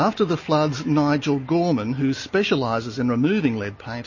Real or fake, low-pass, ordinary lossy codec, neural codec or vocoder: real; 7.2 kHz; MP3, 32 kbps; none